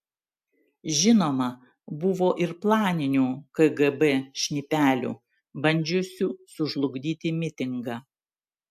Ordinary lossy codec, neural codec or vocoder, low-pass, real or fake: AAC, 96 kbps; none; 14.4 kHz; real